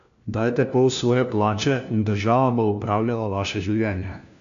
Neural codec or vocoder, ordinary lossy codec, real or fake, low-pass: codec, 16 kHz, 1 kbps, FunCodec, trained on LibriTTS, 50 frames a second; none; fake; 7.2 kHz